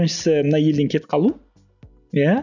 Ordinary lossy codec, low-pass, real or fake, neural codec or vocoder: none; 7.2 kHz; real; none